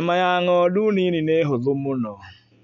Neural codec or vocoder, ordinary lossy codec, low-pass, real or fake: none; none; 7.2 kHz; real